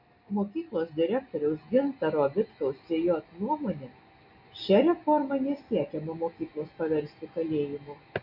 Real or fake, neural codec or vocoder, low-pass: real; none; 5.4 kHz